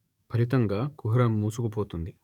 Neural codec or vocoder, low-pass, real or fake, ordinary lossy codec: codec, 44.1 kHz, 7.8 kbps, DAC; 19.8 kHz; fake; none